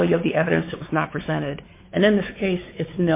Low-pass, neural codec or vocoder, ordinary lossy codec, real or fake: 3.6 kHz; codec, 16 kHz, 4 kbps, X-Codec, WavLM features, trained on Multilingual LibriSpeech; MP3, 24 kbps; fake